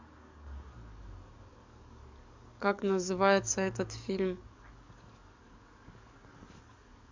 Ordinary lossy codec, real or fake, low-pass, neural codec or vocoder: MP3, 64 kbps; fake; 7.2 kHz; codec, 44.1 kHz, 7.8 kbps, DAC